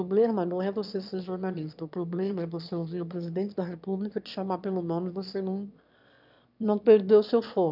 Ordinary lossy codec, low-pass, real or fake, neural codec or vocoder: none; 5.4 kHz; fake; autoencoder, 22.05 kHz, a latent of 192 numbers a frame, VITS, trained on one speaker